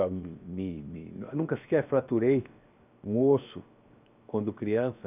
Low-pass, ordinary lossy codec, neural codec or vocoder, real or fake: 3.6 kHz; none; codec, 16 kHz, 0.7 kbps, FocalCodec; fake